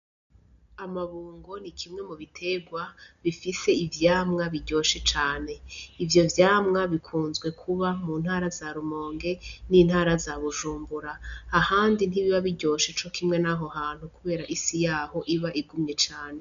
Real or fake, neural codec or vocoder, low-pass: real; none; 7.2 kHz